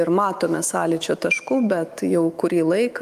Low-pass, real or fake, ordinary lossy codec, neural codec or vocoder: 14.4 kHz; fake; Opus, 32 kbps; autoencoder, 48 kHz, 128 numbers a frame, DAC-VAE, trained on Japanese speech